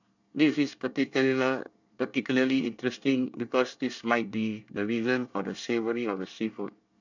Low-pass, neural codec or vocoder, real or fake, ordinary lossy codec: 7.2 kHz; codec, 24 kHz, 1 kbps, SNAC; fake; none